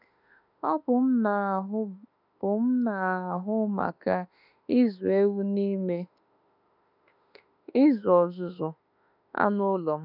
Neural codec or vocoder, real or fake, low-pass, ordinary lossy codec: autoencoder, 48 kHz, 32 numbers a frame, DAC-VAE, trained on Japanese speech; fake; 5.4 kHz; none